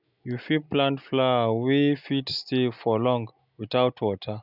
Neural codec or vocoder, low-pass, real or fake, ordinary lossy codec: none; 5.4 kHz; real; none